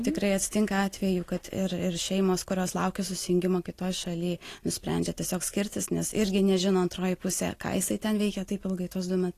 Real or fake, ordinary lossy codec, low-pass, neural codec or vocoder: real; AAC, 48 kbps; 14.4 kHz; none